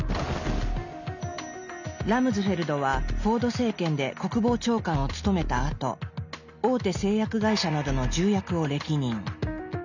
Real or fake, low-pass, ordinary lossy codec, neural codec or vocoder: real; 7.2 kHz; none; none